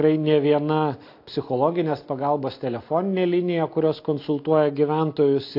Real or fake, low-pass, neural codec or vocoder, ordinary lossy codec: real; 5.4 kHz; none; AAC, 32 kbps